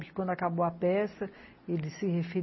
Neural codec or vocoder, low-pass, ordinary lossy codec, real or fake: none; 7.2 kHz; MP3, 24 kbps; real